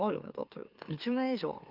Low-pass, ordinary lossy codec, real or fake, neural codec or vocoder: 5.4 kHz; Opus, 32 kbps; fake; autoencoder, 44.1 kHz, a latent of 192 numbers a frame, MeloTTS